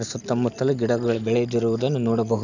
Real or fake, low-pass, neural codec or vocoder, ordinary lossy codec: real; 7.2 kHz; none; none